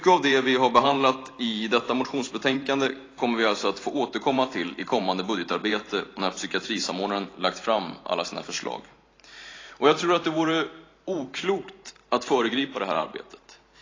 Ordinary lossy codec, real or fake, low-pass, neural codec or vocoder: AAC, 32 kbps; fake; 7.2 kHz; vocoder, 44.1 kHz, 128 mel bands every 512 samples, BigVGAN v2